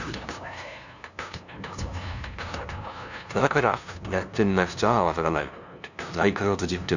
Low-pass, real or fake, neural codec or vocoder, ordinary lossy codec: 7.2 kHz; fake; codec, 16 kHz, 0.5 kbps, FunCodec, trained on LibriTTS, 25 frames a second; none